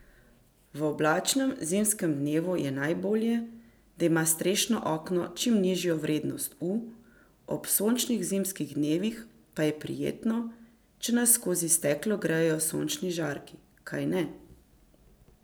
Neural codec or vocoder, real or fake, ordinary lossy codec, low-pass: none; real; none; none